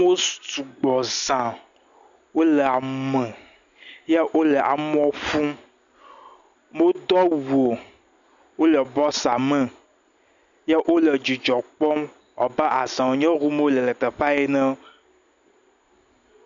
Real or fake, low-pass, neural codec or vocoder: real; 7.2 kHz; none